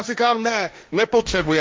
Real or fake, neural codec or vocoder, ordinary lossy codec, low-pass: fake; codec, 16 kHz, 1.1 kbps, Voila-Tokenizer; none; none